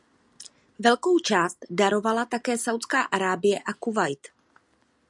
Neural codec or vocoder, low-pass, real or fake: none; 10.8 kHz; real